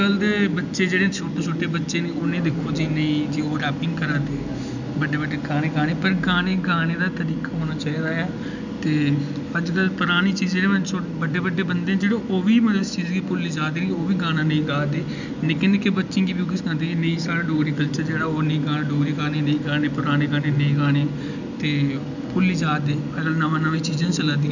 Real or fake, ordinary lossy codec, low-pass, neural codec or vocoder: real; none; 7.2 kHz; none